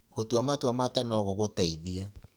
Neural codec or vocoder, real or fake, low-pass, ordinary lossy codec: codec, 44.1 kHz, 2.6 kbps, SNAC; fake; none; none